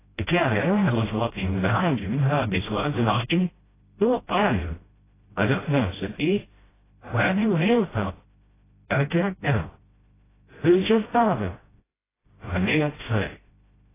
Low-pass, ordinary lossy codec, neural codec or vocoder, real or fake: 3.6 kHz; AAC, 16 kbps; codec, 16 kHz, 0.5 kbps, FreqCodec, smaller model; fake